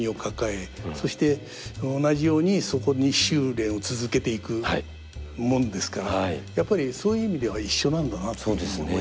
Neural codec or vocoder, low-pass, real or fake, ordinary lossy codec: none; none; real; none